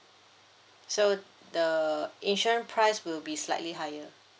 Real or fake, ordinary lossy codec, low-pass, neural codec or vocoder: real; none; none; none